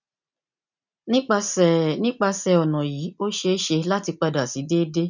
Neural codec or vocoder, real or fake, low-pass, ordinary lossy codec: none; real; 7.2 kHz; none